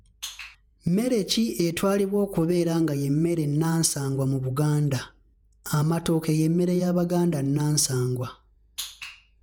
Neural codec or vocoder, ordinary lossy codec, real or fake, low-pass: vocoder, 48 kHz, 128 mel bands, Vocos; none; fake; none